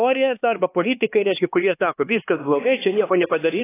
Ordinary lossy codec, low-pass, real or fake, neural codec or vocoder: AAC, 16 kbps; 3.6 kHz; fake; codec, 16 kHz, 2 kbps, X-Codec, HuBERT features, trained on LibriSpeech